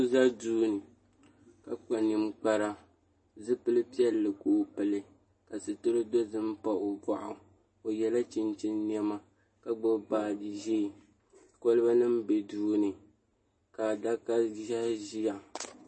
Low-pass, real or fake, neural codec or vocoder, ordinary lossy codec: 9.9 kHz; real; none; MP3, 32 kbps